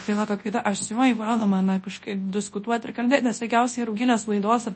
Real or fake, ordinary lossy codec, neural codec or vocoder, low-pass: fake; MP3, 32 kbps; codec, 24 kHz, 0.9 kbps, WavTokenizer, large speech release; 10.8 kHz